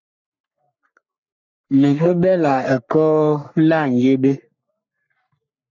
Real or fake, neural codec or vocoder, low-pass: fake; codec, 44.1 kHz, 3.4 kbps, Pupu-Codec; 7.2 kHz